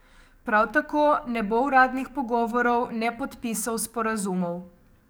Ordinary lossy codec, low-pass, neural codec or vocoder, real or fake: none; none; codec, 44.1 kHz, 7.8 kbps, DAC; fake